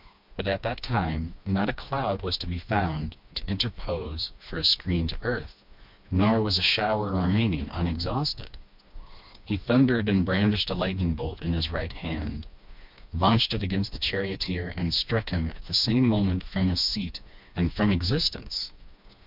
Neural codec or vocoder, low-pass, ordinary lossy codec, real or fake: codec, 16 kHz, 2 kbps, FreqCodec, smaller model; 5.4 kHz; MP3, 48 kbps; fake